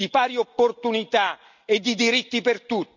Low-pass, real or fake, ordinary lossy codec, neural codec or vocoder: 7.2 kHz; real; none; none